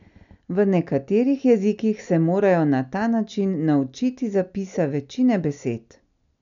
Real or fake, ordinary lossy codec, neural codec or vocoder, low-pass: real; none; none; 7.2 kHz